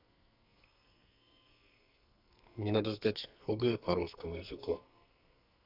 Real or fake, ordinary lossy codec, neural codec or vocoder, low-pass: fake; none; codec, 44.1 kHz, 2.6 kbps, SNAC; 5.4 kHz